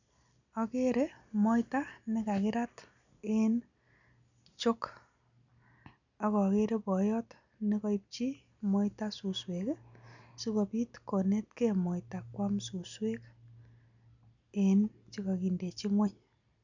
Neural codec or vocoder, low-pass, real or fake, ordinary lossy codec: none; 7.2 kHz; real; none